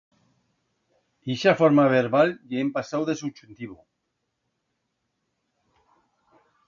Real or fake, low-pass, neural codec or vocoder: real; 7.2 kHz; none